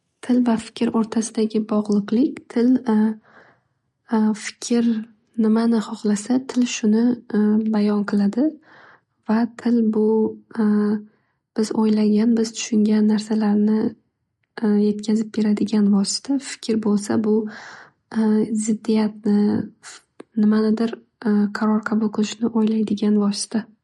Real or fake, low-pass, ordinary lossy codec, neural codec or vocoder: real; 19.8 kHz; MP3, 48 kbps; none